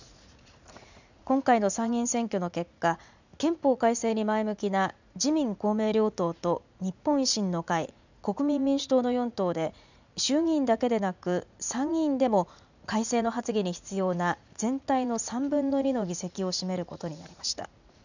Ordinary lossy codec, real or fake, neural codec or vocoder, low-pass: none; fake; vocoder, 44.1 kHz, 80 mel bands, Vocos; 7.2 kHz